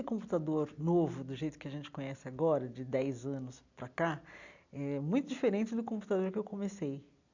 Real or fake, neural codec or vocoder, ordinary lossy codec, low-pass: real; none; none; 7.2 kHz